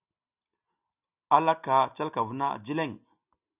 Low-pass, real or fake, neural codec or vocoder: 3.6 kHz; real; none